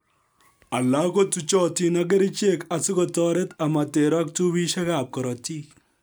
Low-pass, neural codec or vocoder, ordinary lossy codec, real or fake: none; none; none; real